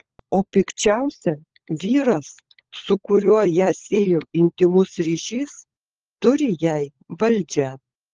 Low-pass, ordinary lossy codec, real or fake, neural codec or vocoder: 7.2 kHz; Opus, 16 kbps; fake; codec, 16 kHz, 16 kbps, FunCodec, trained on LibriTTS, 50 frames a second